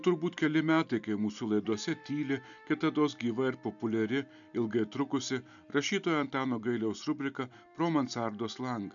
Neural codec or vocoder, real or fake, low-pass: none; real; 7.2 kHz